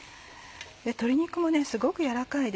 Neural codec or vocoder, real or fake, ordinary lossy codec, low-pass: none; real; none; none